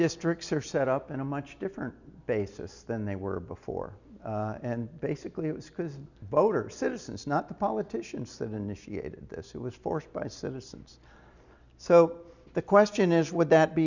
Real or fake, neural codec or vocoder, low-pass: real; none; 7.2 kHz